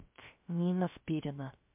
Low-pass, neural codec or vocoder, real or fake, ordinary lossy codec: 3.6 kHz; codec, 16 kHz, 0.3 kbps, FocalCodec; fake; MP3, 32 kbps